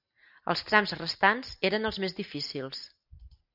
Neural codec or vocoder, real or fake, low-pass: none; real; 5.4 kHz